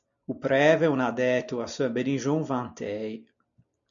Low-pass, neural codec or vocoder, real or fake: 7.2 kHz; none; real